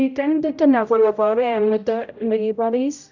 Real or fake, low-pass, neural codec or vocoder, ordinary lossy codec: fake; 7.2 kHz; codec, 16 kHz, 0.5 kbps, X-Codec, HuBERT features, trained on general audio; none